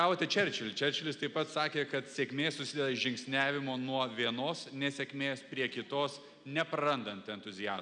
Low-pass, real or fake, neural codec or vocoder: 9.9 kHz; real; none